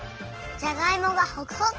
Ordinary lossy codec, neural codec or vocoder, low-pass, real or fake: Opus, 16 kbps; none; 7.2 kHz; real